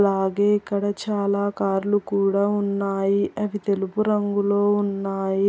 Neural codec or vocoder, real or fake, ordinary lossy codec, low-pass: none; real; none; none